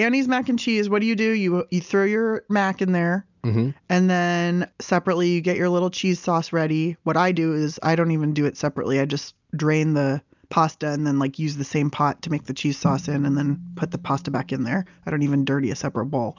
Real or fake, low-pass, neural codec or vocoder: real; 7.2 kHz; none